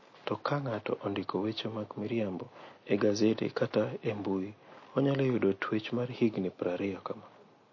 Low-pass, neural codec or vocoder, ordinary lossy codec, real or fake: 7.2 kHz; none; MP3, 32 kbps; real